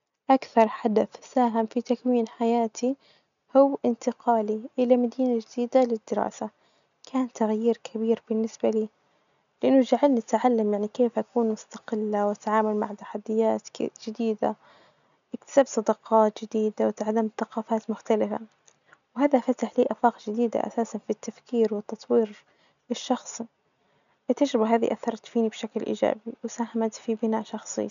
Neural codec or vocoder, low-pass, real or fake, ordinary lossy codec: none; 7.2 kHz; real; MP3, 96 kbps